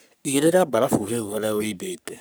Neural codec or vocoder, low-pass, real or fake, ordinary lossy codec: codec, 44.1 kHz, 3.4 kbps, Pupu-Codec; none; fake; none